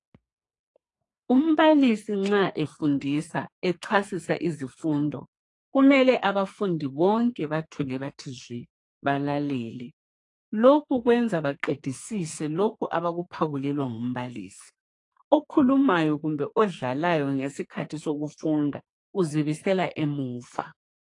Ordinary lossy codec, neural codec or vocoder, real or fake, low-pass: AAC, 48 kbps; codec, 44.1 kHz, 2.6 kbps, SNAC; fake; 10.8 kHz